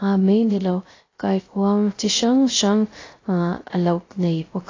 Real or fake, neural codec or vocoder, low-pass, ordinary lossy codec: fake; codec, 16 kHz, 0.3 kbps, FocalCodec; 7.2 kHz; AAC, 32 kbps